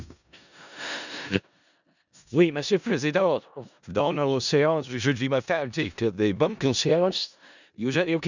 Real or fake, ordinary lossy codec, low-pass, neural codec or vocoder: fake; none; 7.2 kHz; codec, 16 kHz in and 24 kHz out, 0.4 kbps, LongCat-Audio-Codec, four codebook decoder